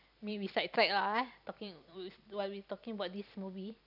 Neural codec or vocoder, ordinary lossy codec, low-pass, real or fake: vocoder, 44.1 kHz, 128 mel bands every 256 samples, BigVGAN v2; none; 5.4 kHz; fake